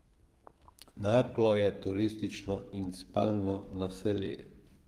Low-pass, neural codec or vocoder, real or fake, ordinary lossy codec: 14.4 kHz; codec, 32 kHz, 1.9 kbps, SNAC; fake; Opus, 32 kbps